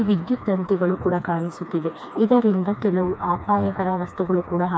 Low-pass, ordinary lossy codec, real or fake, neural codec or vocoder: none; none; fake; codec, 16 kHz, 2 kbps, FreqCodec, smaller model